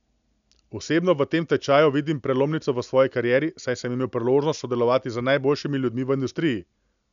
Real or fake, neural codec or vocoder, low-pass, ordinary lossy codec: real; none; 7.2 kHz; none